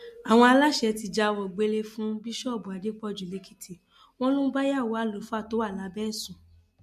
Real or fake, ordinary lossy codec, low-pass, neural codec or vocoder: real; MP3, 64 kbps; 14.4 kHz; none